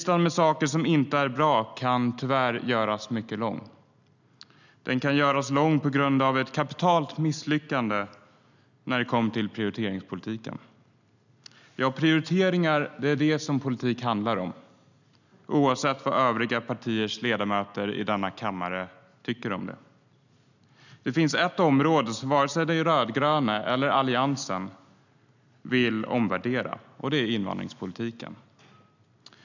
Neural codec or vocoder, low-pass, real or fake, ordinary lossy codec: none; 7.2 kHz; real; none